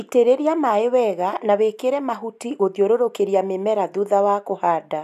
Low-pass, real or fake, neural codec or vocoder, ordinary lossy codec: 14.4 kHz; real; none; none